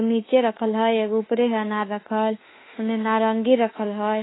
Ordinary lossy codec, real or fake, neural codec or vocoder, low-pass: AAC, 16 kbps; fake; autoencoder, 48 kHz, 32 numbers a frame, DAC-VAE, trained on Japanese speech; 7.2 kHz